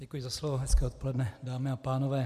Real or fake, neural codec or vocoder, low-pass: real; none; 14.4 kHz